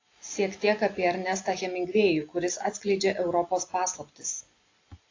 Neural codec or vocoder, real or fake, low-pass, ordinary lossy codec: none; real; 7.2 kHz; AAC, 32 kbps